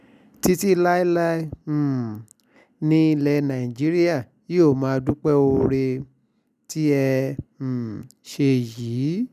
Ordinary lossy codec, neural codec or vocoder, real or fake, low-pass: none; none; real; 14.4 kHz